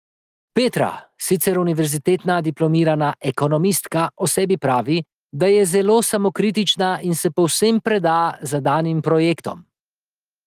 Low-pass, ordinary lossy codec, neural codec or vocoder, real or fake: 14.4 kHz; Opus, 24 kbps; none; real